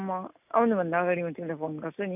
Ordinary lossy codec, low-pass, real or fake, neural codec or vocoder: none; 3.6 kHz; real; none